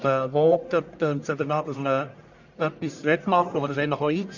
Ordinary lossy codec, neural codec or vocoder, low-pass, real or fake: none; codec, 44.1 kHz, 1.7 kbps, Pupu-Codec; 7.2 kHz; fake